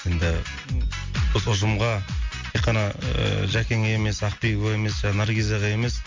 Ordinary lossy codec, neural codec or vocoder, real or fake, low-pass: MP3, 48 kbps; none; real; 7.2 kHz